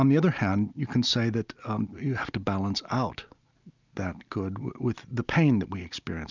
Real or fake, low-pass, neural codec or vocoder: real; 7.2 kHz; none